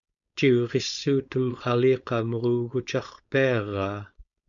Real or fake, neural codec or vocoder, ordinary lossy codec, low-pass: fake; codec, 16 kHz, 4.8 kbps, FACodec; MP3, 96 kbps; 7.2 kHz